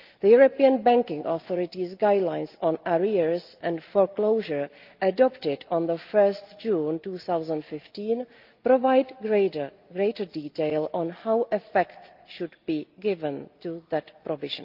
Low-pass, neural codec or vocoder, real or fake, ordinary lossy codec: 5.4 kHz; none; real; Opus, 24 kbps